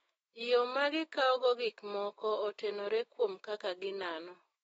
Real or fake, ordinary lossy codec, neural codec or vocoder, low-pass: fake; AAC, 24 kbps; autoencoder, 48 kHz, 128 numbers a frame, DAC-VAE, trained on Japanese speech; 19.8 kHz